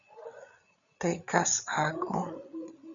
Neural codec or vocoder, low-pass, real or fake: codec, 16 kHz, 8 kbps, FreqCodec, larger model; 7.2 kHz; fake